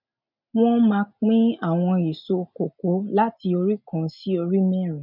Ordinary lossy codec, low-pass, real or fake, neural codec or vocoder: none; 5.4 kHz; real; none